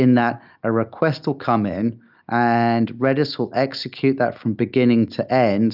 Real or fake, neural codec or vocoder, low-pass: real; none; 5.4 kHz